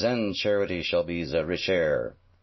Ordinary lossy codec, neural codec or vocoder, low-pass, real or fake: MP3, 24 kbps; none; 7.2 kHz; real